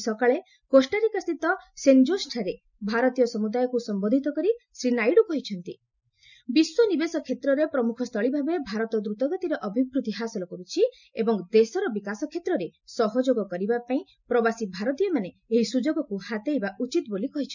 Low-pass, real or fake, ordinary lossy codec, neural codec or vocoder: 7.2 kHz; real; none; none